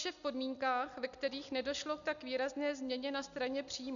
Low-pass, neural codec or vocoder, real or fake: 7.2 kHz; none; real